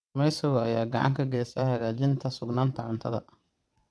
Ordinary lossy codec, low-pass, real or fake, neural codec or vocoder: none; none; fake; vocoder, 22.05 kHz, 80 mel bands, WaveNeXt